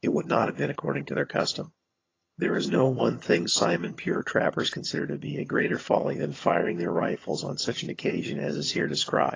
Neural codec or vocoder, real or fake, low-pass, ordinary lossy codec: vocoder, 22.05 kHz, 80 mel bands, HiFi-GAN; fake; 7.2 kHz; AAC, 32 kbps